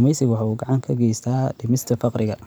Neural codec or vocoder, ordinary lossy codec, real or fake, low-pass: vocoder, 44.1 kHz, 128 mel bands every 512 samples, BigVGAN v2; none; fake; none